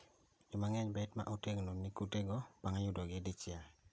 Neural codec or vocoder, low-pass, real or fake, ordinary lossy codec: none; none; real; none